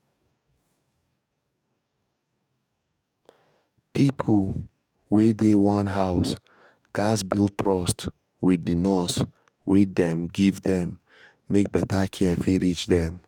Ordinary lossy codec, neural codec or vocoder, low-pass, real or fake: none; codec, 44.1 kHz, 2.6 kbps, DAC; 19.8 kHz; fake